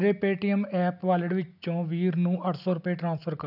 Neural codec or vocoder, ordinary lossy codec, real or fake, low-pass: none; none; real; 5.4 kHz